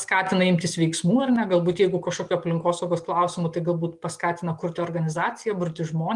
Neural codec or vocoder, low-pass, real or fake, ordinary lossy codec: none; 10.8 kHz; real; Opus, 32 kbps